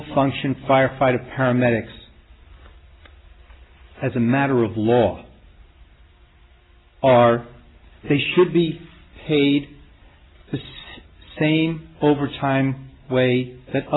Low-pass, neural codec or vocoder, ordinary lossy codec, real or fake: 7.2 kHz; none; AAC, 16 kbps; real